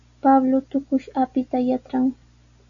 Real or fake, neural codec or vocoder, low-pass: real; none; 7.2 kHz